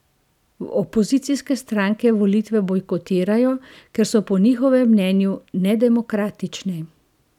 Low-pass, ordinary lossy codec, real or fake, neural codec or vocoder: 19.8 kHz; none; real; none